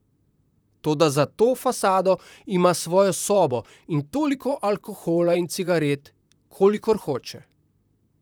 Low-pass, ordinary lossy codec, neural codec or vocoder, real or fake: none; none; vocoder, 44.1 kHz, 128 mel bands, Pupu-Vocoder; fake